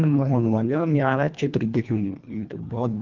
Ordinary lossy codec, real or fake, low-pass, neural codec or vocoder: Opus, 32 kbps; fake; 7.2 kHz; codec, 24 kHz, 1.5 kbps, HILCodec